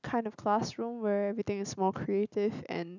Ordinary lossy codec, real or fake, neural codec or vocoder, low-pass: none; real; none; 7.2 kHz